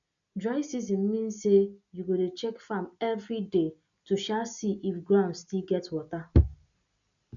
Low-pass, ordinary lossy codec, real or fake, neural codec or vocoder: 7.2 kHz; none; real; none